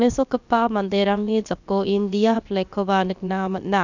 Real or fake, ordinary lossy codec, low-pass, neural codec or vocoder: fake; none; 7.2 kHz; codec, 16 kHz, about 1 kbps, DyCAST, with the encoder's durations